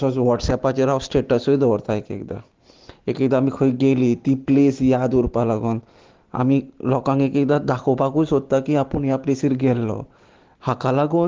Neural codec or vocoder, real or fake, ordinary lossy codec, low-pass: none; real; Opus, 16 kbps; 7.2 kHz